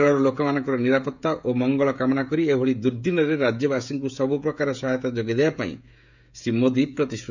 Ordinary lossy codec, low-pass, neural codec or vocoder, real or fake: none; 7.2 kHz; codec, 16 kHz, 16 kbps, FreqCodec, smaller model; fake